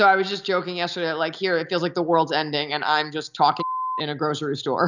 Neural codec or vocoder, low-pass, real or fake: none; 7.2 kHz; real